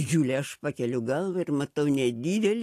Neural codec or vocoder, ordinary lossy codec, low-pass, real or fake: none; AAC, 64 kbps; 14.4 kHz; real